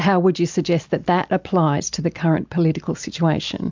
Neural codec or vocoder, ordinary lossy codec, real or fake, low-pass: none; MP3, 64 kbps; real; 7.2 kHz